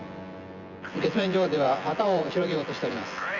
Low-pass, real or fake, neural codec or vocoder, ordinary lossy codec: 7.2 kHz; fake; vocoder, 24 kHz, 100 mel bands, Vocos; Opus, 64 kbps